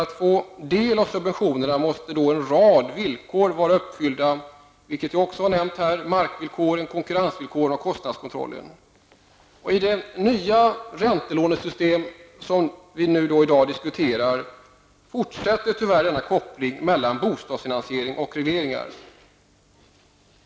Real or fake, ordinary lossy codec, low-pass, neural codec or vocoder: real; none; none; none